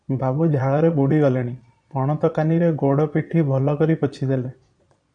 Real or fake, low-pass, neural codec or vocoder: fake; 9.9 kHz; vocoder, 22.05 kHz, 80 mel bands, Vocos